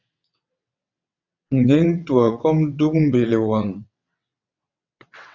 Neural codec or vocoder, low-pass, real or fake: vocoder, 22.05 kHz, 80 mel bands, WaveNeXt; 7.2 kHz; fake